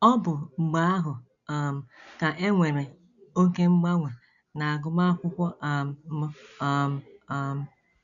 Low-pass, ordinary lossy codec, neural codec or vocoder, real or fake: 7.2 kHz; none; none; real